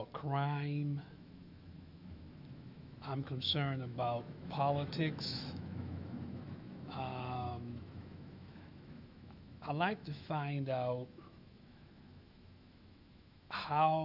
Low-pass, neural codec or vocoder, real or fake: 5.4 kHz; autoencoder, 48 kHz, 128 numbers a frame, DAC-VAE, trained on Japanese speech; fake